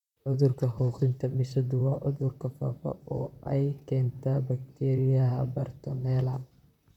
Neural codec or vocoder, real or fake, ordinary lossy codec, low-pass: vocoder, 44.1 kHz, 128 mel bands, Pupu-Vocoder; fake; none; 19.8 kHz